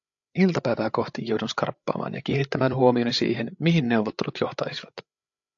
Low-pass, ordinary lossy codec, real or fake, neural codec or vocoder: 7.2 kHz; AAC, 64 kbps; fake; codec, 16 kHz, 8 kbps, FreqCodec, larger model